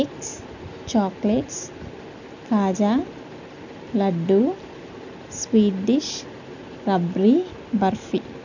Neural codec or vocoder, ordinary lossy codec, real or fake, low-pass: none; none; real; 7.2 kHz